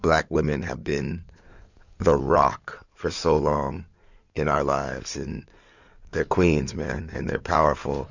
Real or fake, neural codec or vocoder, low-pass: fake; codec, 16 kHz in and 24 kHz out, 2.2 kbps, FireRedTTS-2 codec; 7.2 kHz